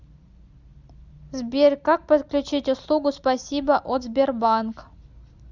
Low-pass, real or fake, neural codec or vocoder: 7.2 kHz; real; none